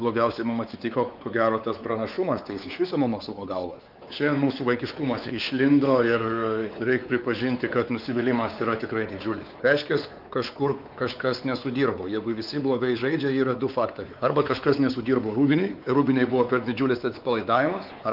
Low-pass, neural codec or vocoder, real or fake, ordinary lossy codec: 5.4 kHz; codec, 16 kHz, 4 kbps, X-Codec, WavLM features, trained on Multilingual LibriSpeech; fake; Opus, 24 kbps